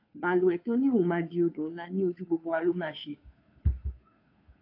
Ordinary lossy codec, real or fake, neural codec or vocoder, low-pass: AAC, 32 kbps; fake; codec, 16 kHz, 2 kbps, FunCodec, trained on Chinese and English, 25 frames a second; 5.4 kHz